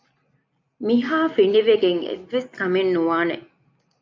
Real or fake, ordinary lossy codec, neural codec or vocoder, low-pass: real; MP3, 64 kbps; none; 7.2 kHz